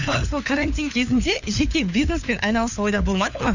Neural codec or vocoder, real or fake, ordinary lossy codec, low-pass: codec, 16 kHz, 4 kbps, FunCodec, trained on LibriTTS, 50 frames a second; fake; none; 7.2 kHz